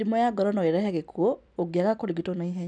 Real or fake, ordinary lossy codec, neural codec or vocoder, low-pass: real; none; none; 9.9 kHz